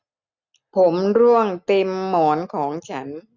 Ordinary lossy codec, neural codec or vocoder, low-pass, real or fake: none; none; 7.2 kHz; real